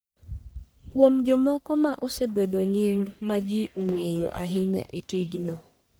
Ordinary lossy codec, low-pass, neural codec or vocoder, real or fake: none; none; codec, 44.1 kHz, 1.7 kbps, Pupu-Codec; fake